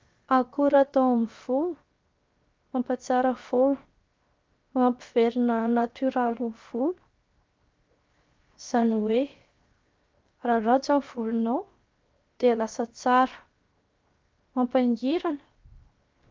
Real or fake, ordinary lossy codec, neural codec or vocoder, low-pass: fake; Opus, 24 kbps; codec, 16 kHz, 0.7 kbps, FocalCodec; 7.2 kHz